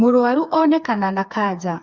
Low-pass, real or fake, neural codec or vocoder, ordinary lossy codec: 7.2 kHz; fake; codec, 16 kHz, 4 kbps, FreqCodec, smaller model; Opus, 64 kbps